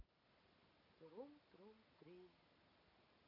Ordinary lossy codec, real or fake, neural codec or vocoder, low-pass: none; real; none; 5.4 kHz